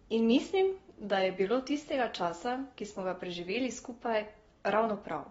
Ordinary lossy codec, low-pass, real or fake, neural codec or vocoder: AAC, 24 kbps; 19.8 kHz; real; none